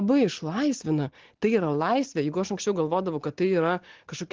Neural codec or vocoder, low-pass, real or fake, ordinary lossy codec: none; 7.2 kHz; real; Opus, 16 kbps